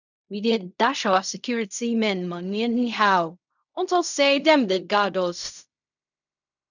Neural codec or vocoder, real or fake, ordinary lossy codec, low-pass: codec, 16 kHz in and 24 kHz out, 0.4 kbps, LongCat-Audio-Codec, fine tuned four codebook decoder; fake; none; 7.2 kHz